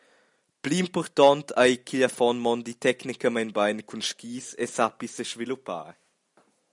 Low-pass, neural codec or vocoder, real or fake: 10.8 kHz; none; real